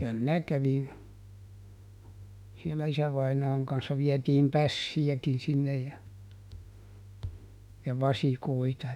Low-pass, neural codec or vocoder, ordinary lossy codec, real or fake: 19.8 kHz; autoencoder, 48 kHz, 32 numbers a frame, DAC-VAE, trained on Japanese speech; none; fake